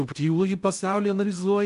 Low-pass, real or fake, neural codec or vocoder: 10.8 kHz; fake; codec, 16 kHz in and 24 kHz out, 0.6 kbps, FocalCodec, streaming, 2048 codes